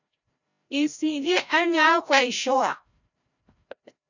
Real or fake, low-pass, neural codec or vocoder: fake; 7.2 kHz; codec, 16 kHz, 0.5 kbps, FreqCodec, larger model